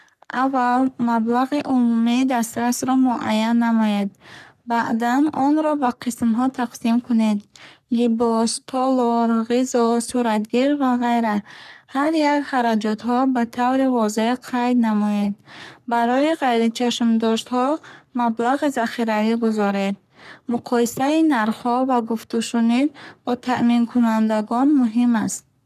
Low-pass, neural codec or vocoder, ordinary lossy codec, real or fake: 14.4 kHz; codec, 44.1 kHz, 3.4 kbps, Pupu-Codec; none; fake